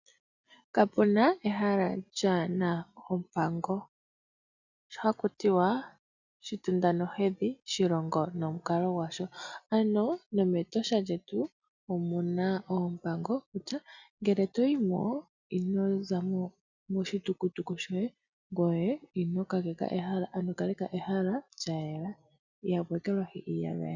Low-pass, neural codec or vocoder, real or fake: 7.2 kHz; none; real